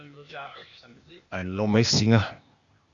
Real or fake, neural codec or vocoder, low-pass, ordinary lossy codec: fake; codec, 16 kHz, 0.8 kbps, ZipCodec; 7.2 kHz; Opus, 64 kbps